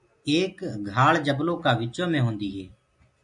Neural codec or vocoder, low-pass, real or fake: none; 10.8 kHz; real